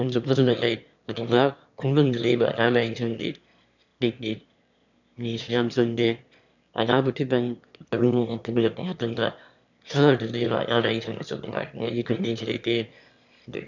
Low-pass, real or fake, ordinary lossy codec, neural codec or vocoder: 7.2 kHz; fake; none; autoencoder, 22.05 kHz, a latent of 192 numbers a frame, VITS, trained on one speaker